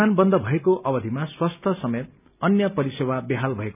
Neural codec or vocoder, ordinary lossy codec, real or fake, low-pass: none; none; real; 3.6 kHz